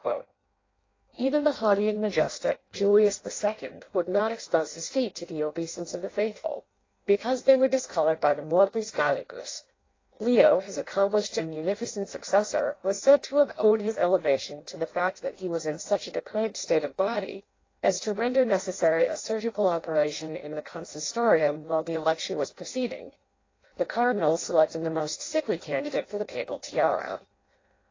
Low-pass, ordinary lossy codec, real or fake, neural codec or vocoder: 7.2 kHz; AAC, 32 kbps; fake; codec, 16 kHz in and 24 kHz out, 0.6 kbps, FireRedTTS-2 codec